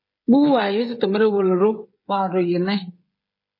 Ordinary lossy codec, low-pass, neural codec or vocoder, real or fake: MP3, 24 kbps; 5.4 kHz; codec, 16 kHz, 8 kbps, FreqCodec, smaller model; fake